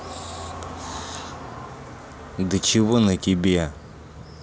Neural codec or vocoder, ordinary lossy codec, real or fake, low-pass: none; none; real; none